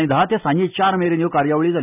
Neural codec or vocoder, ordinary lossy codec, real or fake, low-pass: none; none; real; 3.6 kHz